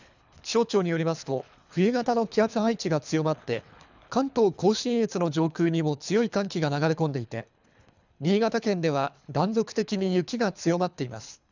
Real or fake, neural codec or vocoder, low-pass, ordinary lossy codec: fake; codec, 24 kHz, 3 kbps, HILCodec; 7.2 kHz; none